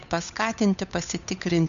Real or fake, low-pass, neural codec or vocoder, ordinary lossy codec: fake; 7.2 kHz; codec, 16 kHz, 8 kbps, FunCodec, trained on Chinese and English, 25 frames a second; MP3, 96 kbps